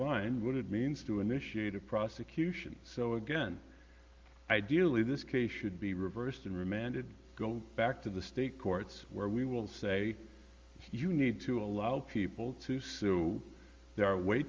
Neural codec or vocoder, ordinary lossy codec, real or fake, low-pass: none; Opus, 32 kbps; real; 7.2 kHz